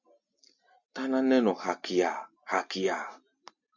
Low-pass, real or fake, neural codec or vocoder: 7.2 kHz; real; none